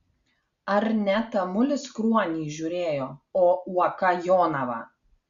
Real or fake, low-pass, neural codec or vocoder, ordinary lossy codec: real; 7.2 kHz; none; Opus, 64 kbps